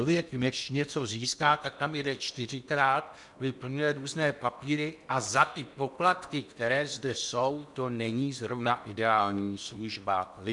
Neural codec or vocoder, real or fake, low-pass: codec, 16 kHz in and 24 kHz out, 0.8 kbps, FocalCodec, streaming, 65536 codes; fake; 10.8 kHz